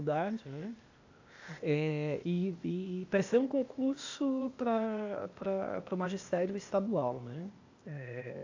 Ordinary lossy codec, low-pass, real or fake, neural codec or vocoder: AAC, 48 kbps; 7.2 kHz; fake; codec, 16 kHz, 0.8 kbps, ZipCodec